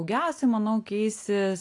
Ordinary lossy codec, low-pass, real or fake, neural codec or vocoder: AAC, 48 kbps; 10.8 kHz; real; none